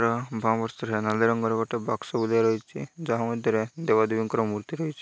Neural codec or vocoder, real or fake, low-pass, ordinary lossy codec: none; real; none; none